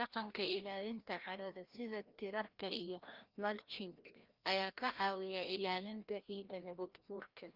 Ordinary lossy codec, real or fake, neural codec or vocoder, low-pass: Opus, 24 kbps; fake; codec, 16 kHz, 1 kbps, FreqCodec, larger model; 5.4 kHz